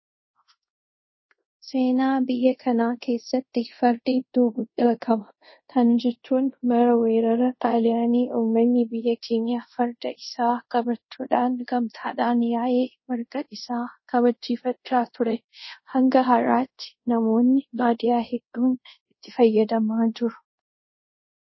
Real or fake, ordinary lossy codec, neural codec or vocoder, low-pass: fake; MP3, 24 kbps; codec, 24 kHz, 0.5 kbps, DualCodec; 7.2 kHz